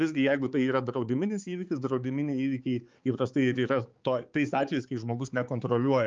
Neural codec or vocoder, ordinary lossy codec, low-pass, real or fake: codec, 16 kHz, 4 kbps, X-Codec, HuBERT features, trained on balanced general audio; Opus, 24 kbps; 7.2 kHz; fake